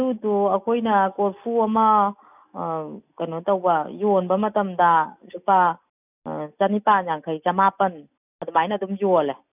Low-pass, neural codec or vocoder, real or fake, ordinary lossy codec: 3.6 kHz; none; real; none